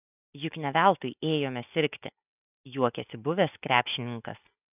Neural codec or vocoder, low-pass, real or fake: none; 3.6 kHz; real